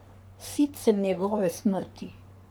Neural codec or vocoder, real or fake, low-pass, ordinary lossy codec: codec, 44.1 kHz, 3.4 kbps, Pupu-Codec; fake; none; none